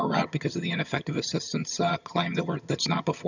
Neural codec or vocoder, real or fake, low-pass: vocoder, 22.05 kHz, 80 mel bands, HiFi-GAN; fake; 7.2 kHz